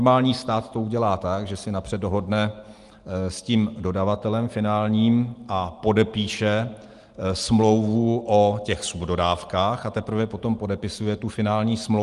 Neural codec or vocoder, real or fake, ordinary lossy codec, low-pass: none; real; Opus, 24 kbps; 10.8 kHz